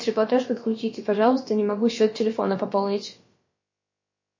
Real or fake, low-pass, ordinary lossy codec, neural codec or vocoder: fake; 7.2 kHz; MP3, 32 kbps; codec, 16 kHz, about 1 kbps, DyCAST, with the encoder's durations